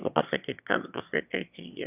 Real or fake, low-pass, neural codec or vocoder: fake; 3.6 kHz; autoencoder, 22.05 kHz, a latent of 192 numbers a frame, VITS, trained on one speaker